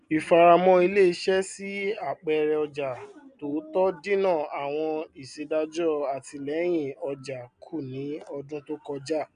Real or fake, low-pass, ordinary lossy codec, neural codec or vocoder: real; 9.9 kHz; none; none